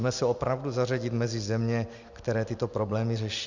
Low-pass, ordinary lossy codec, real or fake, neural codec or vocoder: 7.2 kHz; Opus, 64 kbps; real; none